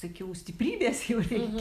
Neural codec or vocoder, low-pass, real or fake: none; 14.4 kHz; real